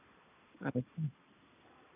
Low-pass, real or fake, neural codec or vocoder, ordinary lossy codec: 3.6 kHz; real; none; none